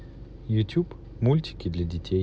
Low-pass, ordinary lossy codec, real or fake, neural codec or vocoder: none; none; real; none